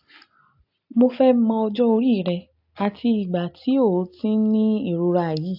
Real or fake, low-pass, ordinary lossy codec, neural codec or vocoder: real; 5.4 kHz; AAC, 48 kbps; none